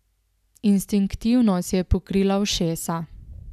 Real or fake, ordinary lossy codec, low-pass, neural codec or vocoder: real; none; 14.4 kHz; none